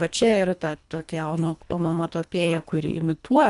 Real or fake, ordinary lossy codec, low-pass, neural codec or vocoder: fake; AAC, 96 kbps; 10.8 kHz; codec, 24 kHz, 1.5 kbps, HILCodec